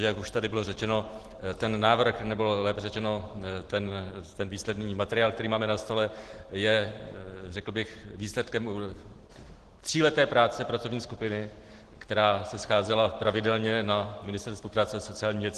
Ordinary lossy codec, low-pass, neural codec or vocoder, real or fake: Opus, 16 kbps; 10.8 kHz; none; real